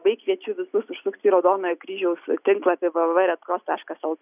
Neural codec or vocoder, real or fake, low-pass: none; real; 3.6 kHz